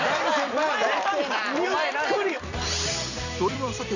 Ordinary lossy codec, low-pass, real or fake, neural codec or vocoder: none; 7.2 kHz; fake; vocoder, 44.1 kHz, 128 mel bands every 256 samples, BigVGAN v2